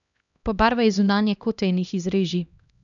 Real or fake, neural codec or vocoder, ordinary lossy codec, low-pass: fake; codec, 16 kHz, 1 kbps, X-Codec, HuBERT features, trained on LibriSpeech; none; 7.2 kHz